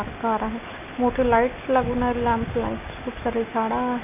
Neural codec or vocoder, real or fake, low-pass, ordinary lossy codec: none; real; 3.6 kHz; none